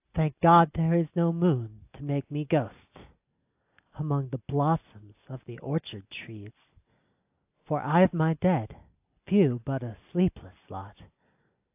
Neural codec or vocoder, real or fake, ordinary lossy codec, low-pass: none; real; AAC, 32 kbps; 3.6 kHz